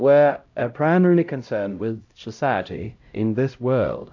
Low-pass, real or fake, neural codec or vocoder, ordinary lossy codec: 7.2 kHz; fake; codec, 16 kHz, 0.5 kbps, X-Codec, HuBERT features, trained on LibriSpeech; AAC, 48 kbps